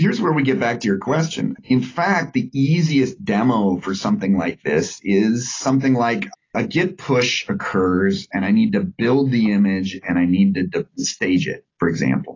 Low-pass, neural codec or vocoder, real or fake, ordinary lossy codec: 7.2 kHz; none; real; AAC, 32 kbps